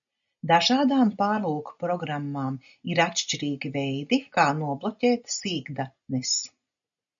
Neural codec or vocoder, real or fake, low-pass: none; real; 7.2 kHz